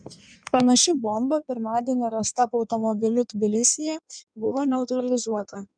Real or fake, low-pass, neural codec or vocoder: fake; 9.9 kHz; codec, 16 kHz in and 24 kHz out, 1.1 kbps, FireRedTTS-2 codec